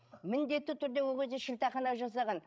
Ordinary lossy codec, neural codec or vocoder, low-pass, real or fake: none; codec, 16 kHz, 16 kbps, FreqCodec, larger model; none; fake